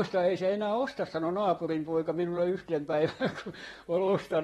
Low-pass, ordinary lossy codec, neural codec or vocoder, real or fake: 19.8 kHz; AAC, 32 kbps; vocoder, 44.1 kHz, 128 mel bands, Pupu-Vocoder; fake